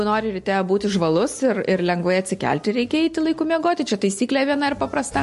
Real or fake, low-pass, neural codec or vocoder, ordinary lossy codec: real; 14.4 kHz; none; MP3, 48 kbps